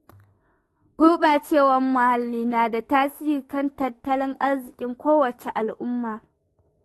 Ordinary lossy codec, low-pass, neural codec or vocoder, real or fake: AAC, 32 kbps; 19.8 kHz; autoencoder, 48 kHz, 32 numbers a frame, DAC-VAE, trained on Japanese speech; fake